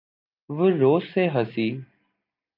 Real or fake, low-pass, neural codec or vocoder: real; 5.4 kHz; none